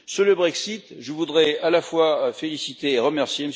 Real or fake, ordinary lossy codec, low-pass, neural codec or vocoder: real; none; none; none